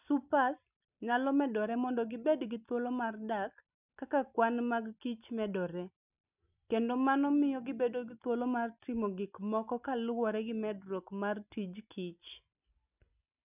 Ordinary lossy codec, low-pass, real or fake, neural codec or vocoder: none; 3.6 kHz; real; none